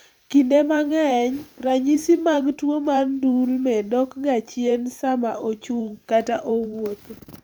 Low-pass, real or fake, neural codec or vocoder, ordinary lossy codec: none; fake; vocoder, 44.1 kHz, 128 mel bands every 512 samples, BigVGAN v2; none